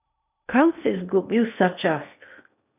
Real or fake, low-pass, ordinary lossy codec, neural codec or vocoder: fake; 3.6 kHz; none; codec, 16 kHz in and 24 kHz out, 0.8 kbps, FocalCodec, streaming, 65536 codes